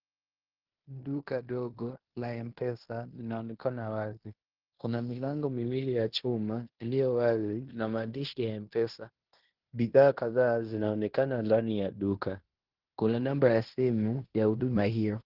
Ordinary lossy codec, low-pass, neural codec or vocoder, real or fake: Opus, 16 kbps; 5.4 kHz; codec, 16 kHz in and 24 kHz out, 0.9 kbps, LongCat-Audio-Codec, fine tuned four codebook decoder; fake